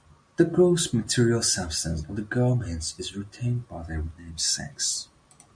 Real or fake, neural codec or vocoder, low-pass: real; none; 9.9 kHz